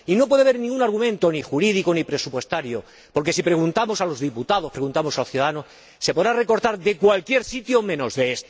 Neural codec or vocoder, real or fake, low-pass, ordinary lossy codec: none; real; none; none